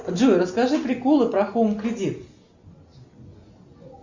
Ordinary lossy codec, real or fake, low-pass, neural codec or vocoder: Opus, 64 kbps; real; 7.2 kHz; none